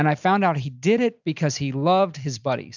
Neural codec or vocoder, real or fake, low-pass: none; real; 7.2 kHz